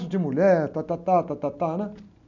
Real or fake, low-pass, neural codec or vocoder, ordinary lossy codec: real; 7.2 kHz; none; none